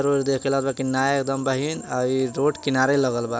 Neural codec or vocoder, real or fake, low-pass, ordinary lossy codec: none; real; none; none